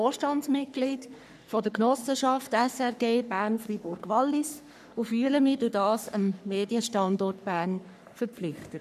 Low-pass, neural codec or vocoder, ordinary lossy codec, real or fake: 14.4 kHz; codec, 44.1 kHz, 3.4 kbps, Pupu-Codec; none; fake